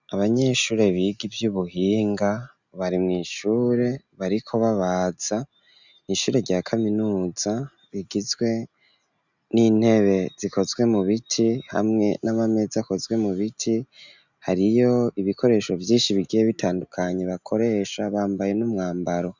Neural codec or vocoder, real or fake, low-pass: none; real; 7.2 kHz